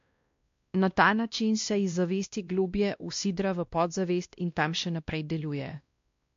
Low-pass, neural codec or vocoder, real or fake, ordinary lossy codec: 7.2 kHz; codec, 16 kHz, 1 kbps, X-Codec, WavLM features, trained on Multilingual LibriSpeech; fake; MP3, 48 kbps